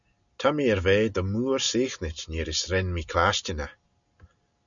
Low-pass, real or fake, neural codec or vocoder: 7.2 kHz; real; none